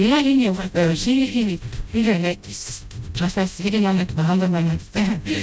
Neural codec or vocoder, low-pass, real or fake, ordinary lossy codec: codec, 16 kHz, 0.5 kbps, FreqCodec, smaller model; none; fake; none